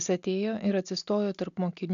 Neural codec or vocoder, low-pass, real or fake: none; 7.2 kHz; real